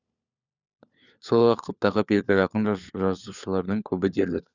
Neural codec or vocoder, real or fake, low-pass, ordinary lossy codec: codec, 16 kHz, 4 kbps, FunCodec, trained on LibriTTS, 50 frames a second; fake; 7.2 kHz; none